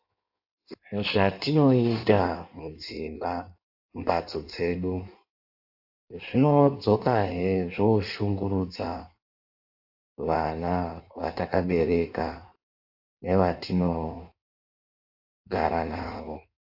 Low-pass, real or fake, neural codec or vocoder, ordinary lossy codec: 5.4 kHz; fake; codec, 16 kHz in and 24 kHz out, 1.1 kbps, FireRedTTS-2 codec; AAC, 48 kbps